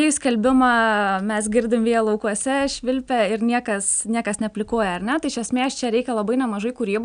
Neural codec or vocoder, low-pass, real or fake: none; 9.9 kHz; real